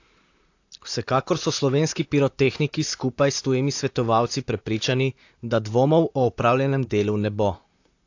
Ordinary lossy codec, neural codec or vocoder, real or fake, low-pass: AAC, 48 kbps; vocoder, 44.1 kHz, 128 mel bands, Pupu-Vocoder; fake; 7.2 kHz